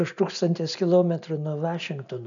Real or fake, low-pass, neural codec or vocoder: real; 7.2 kHz; none